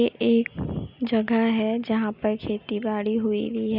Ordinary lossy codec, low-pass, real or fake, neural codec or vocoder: none; 5.4 kHz; real; none